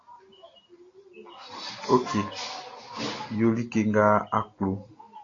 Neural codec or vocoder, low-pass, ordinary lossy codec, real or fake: none; 7.2 kHz; AAC, 32 kbps; real